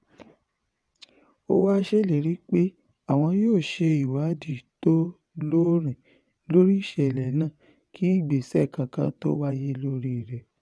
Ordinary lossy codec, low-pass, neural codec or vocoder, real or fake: none; none; vocoder, 22.05 kHz, 80 mel bands, WaveNeXt; fake